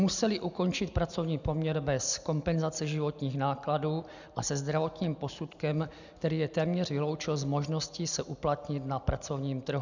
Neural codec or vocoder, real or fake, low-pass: none; real; 7.2 kHz